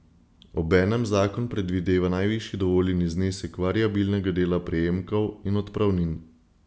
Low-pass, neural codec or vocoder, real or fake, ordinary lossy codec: none; none; real; none